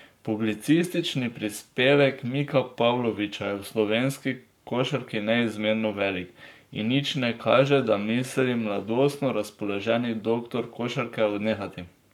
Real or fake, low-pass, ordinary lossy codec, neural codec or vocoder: fake; 19.8 kHz; none; codec, 44.1 kHz, 7.8 kbps, Pupu-Codec